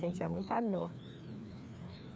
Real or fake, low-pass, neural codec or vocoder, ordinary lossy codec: fake; none; codec, 16 kHz, 2 kbps, FreqCodec, larger model; none